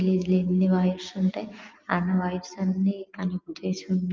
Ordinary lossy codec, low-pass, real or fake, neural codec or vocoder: Opus, 32 kbps; 7.2 kHz; real; none